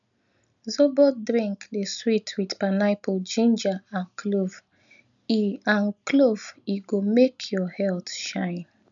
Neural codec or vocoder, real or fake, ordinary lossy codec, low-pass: none; real; none; 7.2 kHz